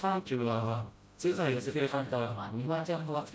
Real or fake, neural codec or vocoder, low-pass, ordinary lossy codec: fake; codec, 16 kHz, 0.5 kbps, FreqCodec, smaller model; none; none